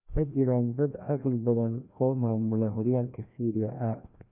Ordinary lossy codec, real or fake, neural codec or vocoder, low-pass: none; fake; codec, 16 kHz, 1 kbps, FreqCodec, larger model; 3.6 kHz